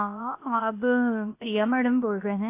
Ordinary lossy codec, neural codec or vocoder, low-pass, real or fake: AAC, 24 kbps; codec, 16 kHz, 0.7 kbps, FocalCodec; 3.6 kHz; fake